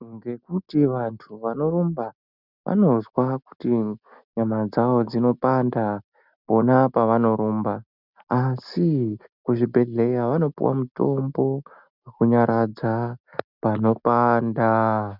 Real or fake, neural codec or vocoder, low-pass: real; none; 5.4 kHz